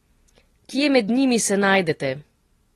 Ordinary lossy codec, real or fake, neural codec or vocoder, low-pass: AAC, 32 kbps; real; none; 19.8 kHz